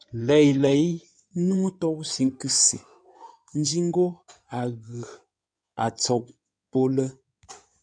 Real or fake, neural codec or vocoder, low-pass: fake; codec, 16 kHz in and 24 kHz out, 2.2 kbps, FireRedTTS-2 codec; 9.9 kHz